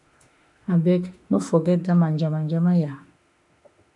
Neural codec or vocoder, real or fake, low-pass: autoencoder, 48 kHz, 32 numbers a frame, DAC-VAE, trained on Japanese speech; fake; 10.8 kHz